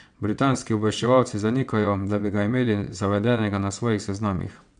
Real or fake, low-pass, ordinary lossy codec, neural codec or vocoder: fake; 9.9 kHz; none; vocoder, 22.05 kHz, 80 mel bands, WaveNeXt